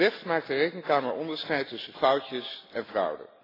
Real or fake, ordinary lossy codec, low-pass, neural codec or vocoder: real; AAC, 24 kbps; 5.4 kHz; none